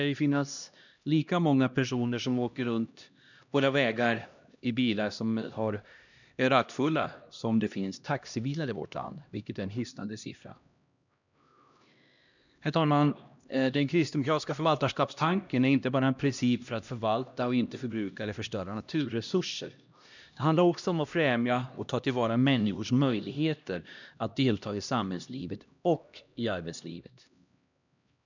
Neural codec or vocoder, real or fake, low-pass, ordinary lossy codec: codec, 16 kHz, 1 kbps, X-Codec, HuBERT features, trained on LibriSpeech; fake; 7.2 kHz; none